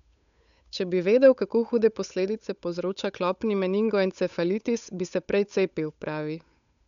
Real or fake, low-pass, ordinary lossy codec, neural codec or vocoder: fake; 7.2 kHz; none; codec, 16 kHz, 8 kbps, FunCodec, trained on Chinese and English, 25 frames a second